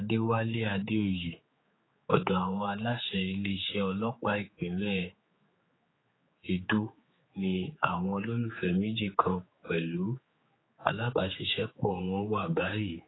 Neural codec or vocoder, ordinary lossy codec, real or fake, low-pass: codec, 16 kHz, 4 kbps, X-Codec, HuBERT features, trained on general audio; AAC, 16 kbps; fake; 7.2 kHz